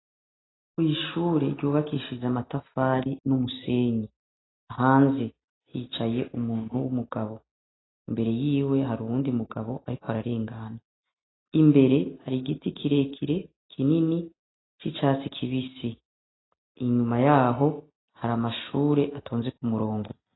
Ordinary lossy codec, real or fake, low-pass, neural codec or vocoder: AAC, 16 kbps; real; 7.2 kHz; none